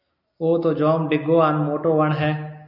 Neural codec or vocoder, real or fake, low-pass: none; real; 5.4 kHz